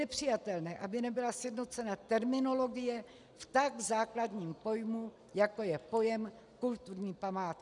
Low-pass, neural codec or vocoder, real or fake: 10.8 kHz; none; real